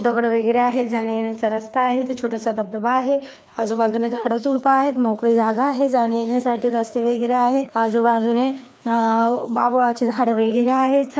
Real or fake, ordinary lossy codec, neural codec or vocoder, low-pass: fake; none; codec, 16 kHz, 2 kbps, FreqCodec, larger model; none